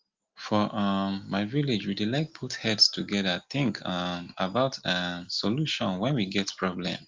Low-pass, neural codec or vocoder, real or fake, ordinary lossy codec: 7.2 kHz; none; real; Opus, 24 kbps